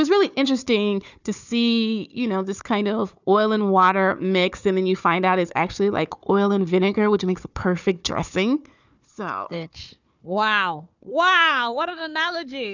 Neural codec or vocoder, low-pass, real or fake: codec, 16 kHz, 4 kbps, FunCodec, trained on Chinese and English, 50 frames a second; 7.2 kHz; fake